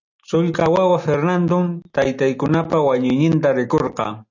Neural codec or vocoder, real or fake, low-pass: none; real; 7.2 kHz